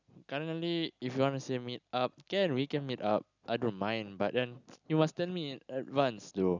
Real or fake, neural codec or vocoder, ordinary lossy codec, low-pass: real; none; none; 7.2 kHz